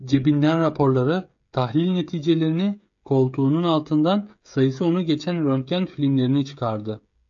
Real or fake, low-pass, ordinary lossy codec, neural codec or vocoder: fake; 7.2 kHz; AAC, 64 kbps; codec, 16 kHz, 16 kbps, FreqCodec, smaller model